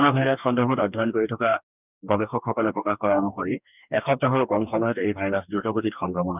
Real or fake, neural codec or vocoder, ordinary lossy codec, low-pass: fake; codec, 44.1 kHz, 2.6 kbps, DAC; none; 3.6 kHz